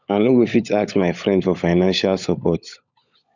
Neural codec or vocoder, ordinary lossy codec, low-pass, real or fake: codec, 16 kHz, 16 kbps, FunCodec, trained on LibriTTS, 50 frames a second; none; 7.2 kHz; fake